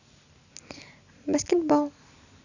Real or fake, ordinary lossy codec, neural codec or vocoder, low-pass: real; none; none; 7.2 kHz